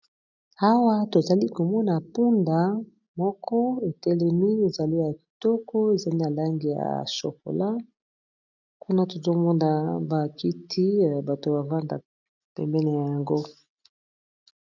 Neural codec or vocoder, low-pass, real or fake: none; 7.2 kHz; real